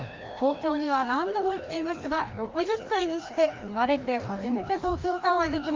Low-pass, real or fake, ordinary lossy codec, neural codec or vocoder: 7.2 kHz; fake; Opus, 32 kbps; codec, 16 kHz, 1 kbps, FreqCodec, larger model